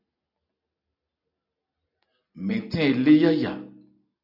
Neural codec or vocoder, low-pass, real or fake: none; 5.4 kHz; real